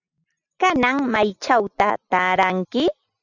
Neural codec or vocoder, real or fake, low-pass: none; real; 7.2 kHz